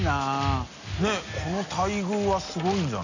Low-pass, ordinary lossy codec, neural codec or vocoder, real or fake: 7.2 kHz; none; none; real